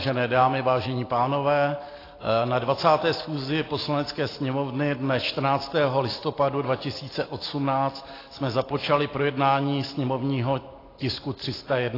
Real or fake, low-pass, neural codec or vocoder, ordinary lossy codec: real; 5.4 kHz; none; AAC, 24 kbps